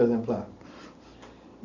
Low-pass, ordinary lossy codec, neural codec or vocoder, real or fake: 7.2 kHz; none; none; real